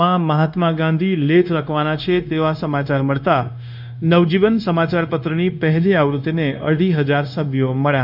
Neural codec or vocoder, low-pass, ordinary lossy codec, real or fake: codec, 16 kHz, 0.9 kbps, LongCat-Audio-Codec; 5.4 kHz; none; fake